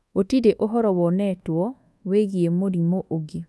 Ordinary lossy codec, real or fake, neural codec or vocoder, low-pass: none; fake; codec, 24 kHz, 1.2 kbps, DualCodec; none